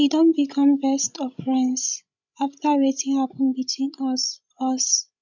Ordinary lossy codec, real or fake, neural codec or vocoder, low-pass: none; fake; codec, 16 kHz, 16 kbps, FreqCodec, larger model; 7.2 kHz